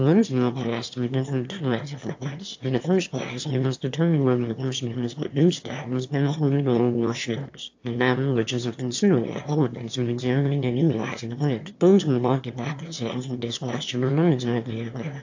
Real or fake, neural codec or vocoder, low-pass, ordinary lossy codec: fake; autoencoder, 22.05 kHz, a latent of 192 numbers a frame, VITS, trained on one speaker; 7.2 kHz; AAC, 48 kbps